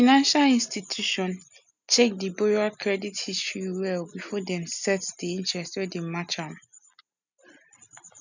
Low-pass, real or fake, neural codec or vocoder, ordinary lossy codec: 7.2 kHz; real; none; none